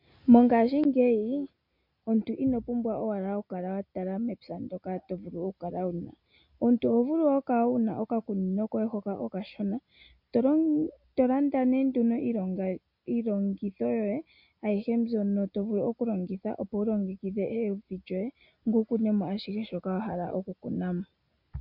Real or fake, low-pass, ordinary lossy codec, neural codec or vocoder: real; 5.4 kHz; MP3, 48 kbps; none